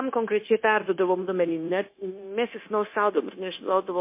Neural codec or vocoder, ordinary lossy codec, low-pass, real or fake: codec, 16 kHz, 0.9 kbps, LongCat-Audio-Codec; MP3, 24 kbps; 3.6 kHz; fake